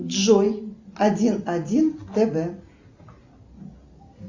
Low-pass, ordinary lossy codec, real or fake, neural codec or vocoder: 7.2 kHz; Opus, 64 kbps; real; none